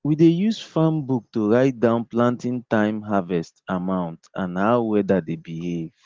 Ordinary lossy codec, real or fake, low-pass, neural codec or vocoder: Opus, 16 kbps; real; 7.2 kHz; none